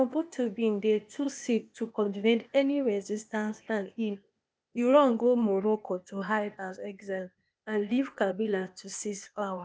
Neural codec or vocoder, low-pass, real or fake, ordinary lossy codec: codec, 16 kHz, 0.8 kbps, ZipCodec; none; fake; none